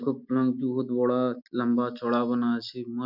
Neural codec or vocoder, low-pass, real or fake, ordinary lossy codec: none; 5.4 kHz; real; none